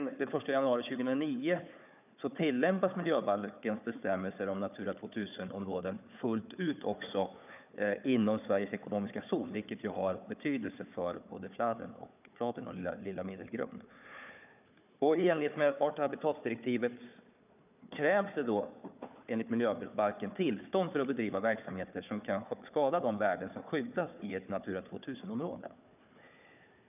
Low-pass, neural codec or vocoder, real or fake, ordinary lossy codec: 3.6 kHz; codec, 16 kHz, 4 kbps, FunCodec, trained on Chinese and English, 50 frames a second; fake; none